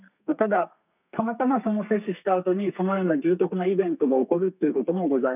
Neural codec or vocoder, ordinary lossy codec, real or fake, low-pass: codec, 32 kHz, 1.9 kbps, SNAC; none; fake; 3.6 kHz